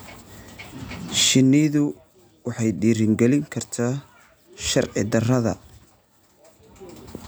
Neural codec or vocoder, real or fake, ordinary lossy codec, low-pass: none; real; none; none